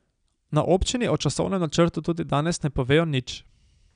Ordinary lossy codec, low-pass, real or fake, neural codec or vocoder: none; 9.9 kHz; real; none